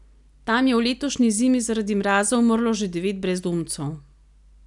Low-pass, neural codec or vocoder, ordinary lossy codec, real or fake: 10.8 kHz; none; none; real